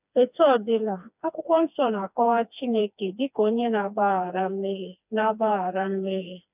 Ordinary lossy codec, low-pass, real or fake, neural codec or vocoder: none; 3.6 kHz; fake; codec, 16 kHz, 2 kbps, FreqCodec, smaller model